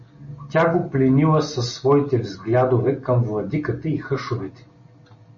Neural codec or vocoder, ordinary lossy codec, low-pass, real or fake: none; MP3, 32 kbps; 7.2 kHz; real